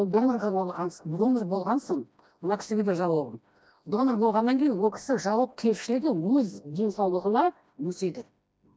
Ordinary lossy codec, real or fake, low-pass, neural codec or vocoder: none; fake; none; codec, 16 kHz, 1 kbps, FreqCodec, smaller model